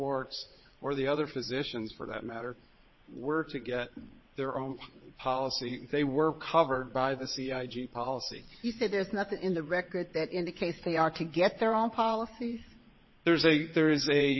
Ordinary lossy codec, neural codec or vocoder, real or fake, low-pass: MP3, 24 kbps; vocoder, 22.05 kHz, 80 mel bands, WaveNeXt; fake; 7.2 kHz